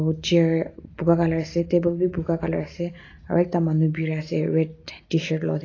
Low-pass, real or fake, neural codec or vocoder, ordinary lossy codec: 7.2 kHz; real; none; AAC, 32 kbps